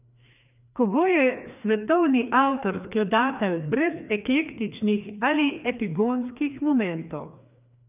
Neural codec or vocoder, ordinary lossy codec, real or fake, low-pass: codec, 16 kHz, 2 kbps, FreqCodec, larger model; none; fake; 3.6 kHz